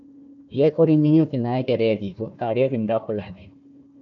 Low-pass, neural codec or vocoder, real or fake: 7.2 kHz; codec, 16 kHz, 1 kbps, FunCodec, trained on Chinese and English, 50 frames a second; fake